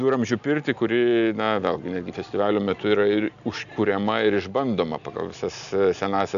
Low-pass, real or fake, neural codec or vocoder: 7.2 kHz; real; none